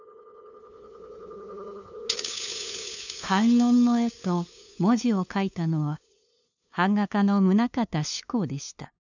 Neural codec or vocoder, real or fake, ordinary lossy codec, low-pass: codec, 16 kHz, 2 kbps, FunCodec, trained on LibriTTS, 25 frames a second; fake; none; 7.2 kHz